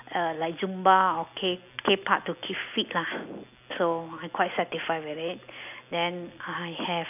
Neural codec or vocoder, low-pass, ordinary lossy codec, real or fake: none; 3.6 kHz; none; real